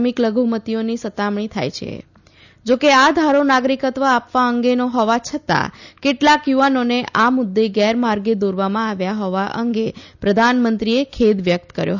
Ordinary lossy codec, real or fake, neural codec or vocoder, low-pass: none; real; none; 7.2 kHz